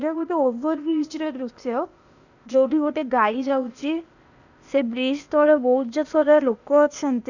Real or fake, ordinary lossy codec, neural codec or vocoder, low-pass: fake; none; codec, 16 kHz, 0.8 kbps, ZipCodec; 7.2 kHz